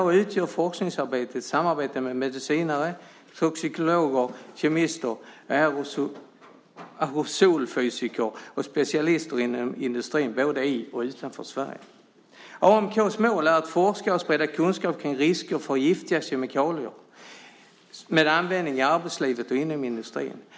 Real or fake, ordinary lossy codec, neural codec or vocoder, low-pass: real; none; none; none